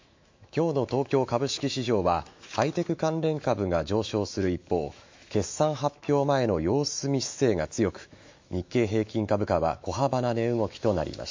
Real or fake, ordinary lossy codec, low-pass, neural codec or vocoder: fake; MP3, 48 kbps; 7.2 kHz; autoencoder, 48 kHz, 128 numbers a frame, DAC-VAE, trained on Japanese speech